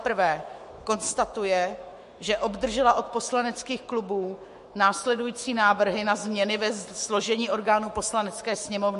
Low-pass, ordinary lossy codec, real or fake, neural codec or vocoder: 14.4 kHz; MP3, 48 kbps; fake; autoencoder, 48 kHz, 128 numbers a frame, DAC-VAE, trained on Japanese speech